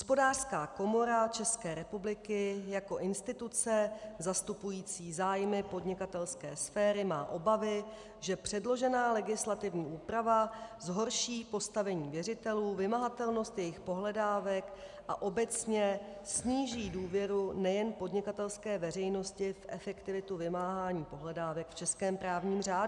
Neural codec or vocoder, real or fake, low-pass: none; real; 10.8 kHz